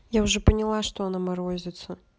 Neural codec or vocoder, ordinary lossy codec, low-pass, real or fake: none; none; none; real